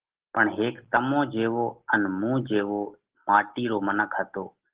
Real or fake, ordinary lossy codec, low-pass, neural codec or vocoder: real; Opus, 16 kbps; 3.6 kHz; none